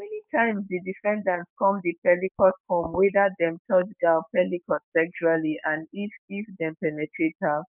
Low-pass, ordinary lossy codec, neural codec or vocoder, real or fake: 3.6 kHz; none; codec, 44.1 kHz, 7.8 kbps, DAC; fake